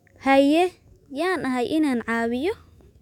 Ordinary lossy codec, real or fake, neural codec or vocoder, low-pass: none; fake; autoencoder, 48 kHz, 128 numbers a frame, DAC-VAE, trained on Japanese speech; 19.8 kHz